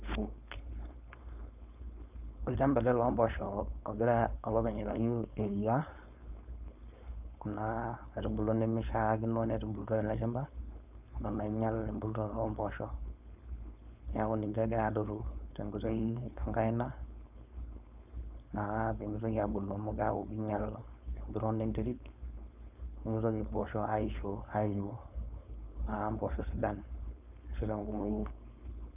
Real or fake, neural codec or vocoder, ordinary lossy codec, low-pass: fake; codec, 16 kHz, 4.8 kbps, FACodec; none; 3.6 kHz